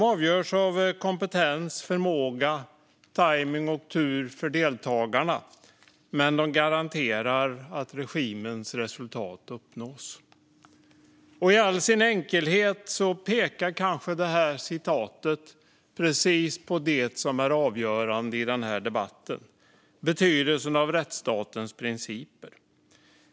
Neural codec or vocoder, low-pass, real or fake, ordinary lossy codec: none; none; real; none